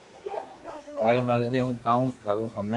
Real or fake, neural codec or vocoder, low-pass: fake; codec, 24 kHz, 1 kbps, SNAC; 10.8 kHz